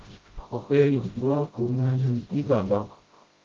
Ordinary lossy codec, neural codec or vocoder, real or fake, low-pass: Opus, 32 kbps; codec, 16 kHz, 0.5 kbps, FreqCodec, smaller model; fake; 7.2 kHz